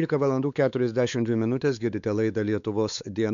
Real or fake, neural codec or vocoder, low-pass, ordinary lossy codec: fake; codec, 16 kHz, 4 kbps, X-Codec, WavLM features, trained on Multilingual LibriSpeech; 7.2 kHz; MP3, 96 kbps